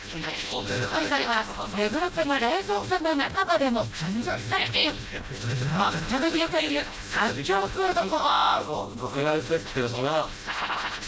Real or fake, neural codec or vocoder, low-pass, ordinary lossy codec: fake; codec, 16 kHz, 0.5 kbps, FreqCodec, smaller model; none; none